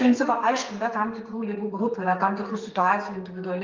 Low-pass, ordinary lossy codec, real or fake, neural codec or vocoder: 7.2 kHz; Opus, 24 kbps; fake; codec, 32 kHz, 1.9 kbps, SNAC